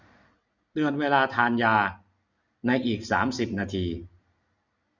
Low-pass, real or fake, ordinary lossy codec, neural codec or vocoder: 7.2 kHz; real; none; none